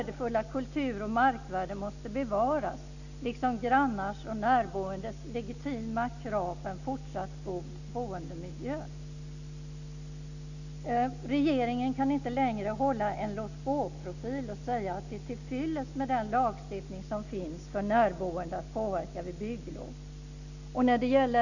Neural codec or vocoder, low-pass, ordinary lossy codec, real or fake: none; 7.2 kHz; none; real